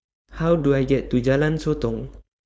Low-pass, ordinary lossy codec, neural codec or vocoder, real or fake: none; none; codec, 16 kHz, 4.8 kbps, FACodec; fake